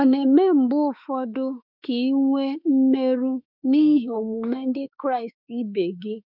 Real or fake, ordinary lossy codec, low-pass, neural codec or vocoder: fake; none; 5.4 kHz; autoencoder, 48 kHz, 32 numbers a frame, DAC-VAE, trained on Japanese speech